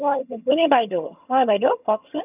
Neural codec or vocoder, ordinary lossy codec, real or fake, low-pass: vocoder, 22.05 kHz, 80 mel bands, HiFi-GAN; none; fake; 3.6 kHz